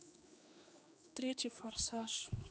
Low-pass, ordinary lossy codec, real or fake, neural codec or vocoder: none; none; fake; codec, 16 kHz, 2 kbps, X-Codec, HuBERT features, trained on general audio